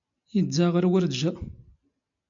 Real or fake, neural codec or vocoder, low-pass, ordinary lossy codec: real; none; 7.2 kHz; MP3, 64 kbps